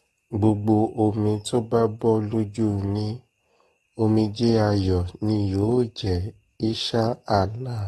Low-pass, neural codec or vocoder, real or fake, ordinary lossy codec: 19.8 kHz; codec, 44.1 kHz, 7.8 kbps, DAC; fake; AAC, 32 kbps